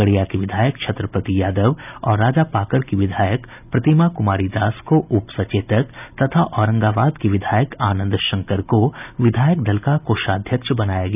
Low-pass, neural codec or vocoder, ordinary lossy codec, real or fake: 3.6 kHz; none; none; real